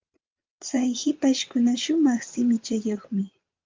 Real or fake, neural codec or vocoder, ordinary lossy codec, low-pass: fake; vocoder, 22.05 kHz, 80 mel bands, WaveNeXt; Opus, 32 kbps; 7.2 kHz